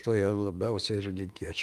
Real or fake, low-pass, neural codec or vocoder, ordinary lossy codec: fake; 14.4 kHz; autoencoder, 48 kHz, 32 numbers a frame, DAC-VAE, trained on Japanese speech; Opus, 32 kbps